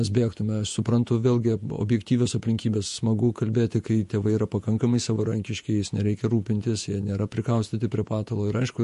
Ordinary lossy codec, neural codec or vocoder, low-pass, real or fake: MP3, 48 kbps; vocoder, 48 kHz, 128 mel bands, Vocos; 14.4 kHz; fake